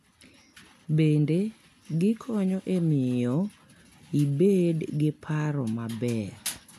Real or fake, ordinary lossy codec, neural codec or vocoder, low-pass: real; none; none; 14.4 kHz